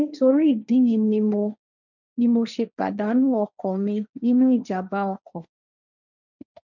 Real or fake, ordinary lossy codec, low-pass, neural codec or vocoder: fake; none; 7.2 kHz; codec, 16 kHz, 1.1 kbps, Voila-Tokenizer